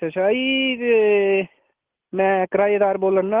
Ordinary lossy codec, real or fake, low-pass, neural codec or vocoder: Opus, 16 kbps; real; 3.6 kHz; none